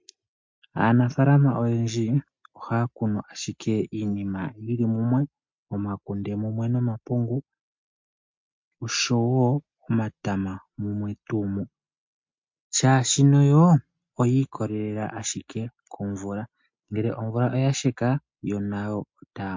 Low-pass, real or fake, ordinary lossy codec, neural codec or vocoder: 7.2 kHz; real; MP3, 48 kbps; none